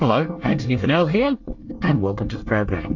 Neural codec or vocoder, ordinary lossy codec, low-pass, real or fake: codec, 24 kHz, 1 kbps, SNAC; AAC, 48 kbps; 7.2 kHz; fake